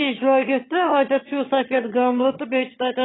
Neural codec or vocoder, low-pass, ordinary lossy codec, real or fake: vocoder, 22.05 kHz, 80 mel bands, HiFi-GAN; 7.2 kHz; AAC, 16 kbps; fake